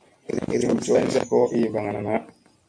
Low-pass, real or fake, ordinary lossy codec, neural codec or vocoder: 9.9 kHz; fake; MP3, 64 kbps; vocoder, 44.1 kHz, 128 mel bands every 512 samples, BigVGAN v2